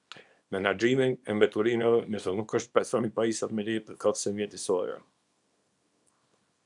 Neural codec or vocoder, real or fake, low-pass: codec, 24 kHz, 0.9 kbps, WavTokenizer, small release; fake; 10.8 kHz